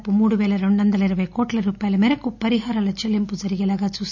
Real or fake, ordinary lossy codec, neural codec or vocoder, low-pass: real; none; none; 7.2 kHz